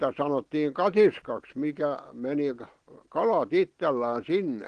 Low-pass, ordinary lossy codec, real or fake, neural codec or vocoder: 9.9 kHz; Opus, 16 kbps; real; none